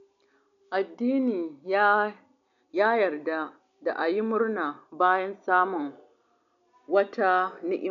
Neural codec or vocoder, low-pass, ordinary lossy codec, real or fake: none; 7.2 kHz; none; real